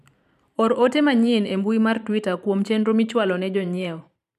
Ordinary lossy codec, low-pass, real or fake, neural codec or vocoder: none; 14.4 kHz; fake; vocoder, 44.1 kHz, 128 mel bands every 512 samples, BigVGAN v2